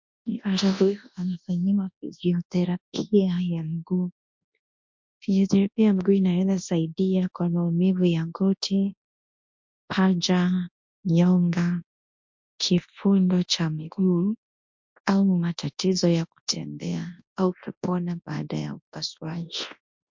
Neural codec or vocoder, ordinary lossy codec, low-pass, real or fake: codec, 24 kHz, 0.9 kbps, WavTokenizer, large speech release; MP3, 48 kbps; 7.2 kHz; fake